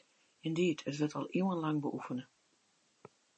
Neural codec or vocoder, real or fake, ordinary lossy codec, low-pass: none; real; MP3, 32 kbps; 10.8 kHz